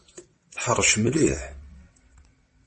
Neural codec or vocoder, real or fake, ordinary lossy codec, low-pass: vocoder, 22.05 kHz, 80 mel bands, WaveNeXt; fake; MP3, 32 kbps; 9.9 kHz